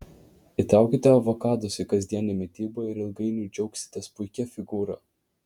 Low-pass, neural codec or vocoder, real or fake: 19.8 kHz; vocoder, 48 kHz, 128 mel bands, Vocos; fake